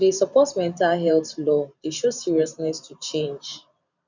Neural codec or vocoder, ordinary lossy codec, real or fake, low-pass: vocoder, 44.1 kHz, 128 mel bands every 512 samples, BigVGAN v2; none; fake; 7.2 kHz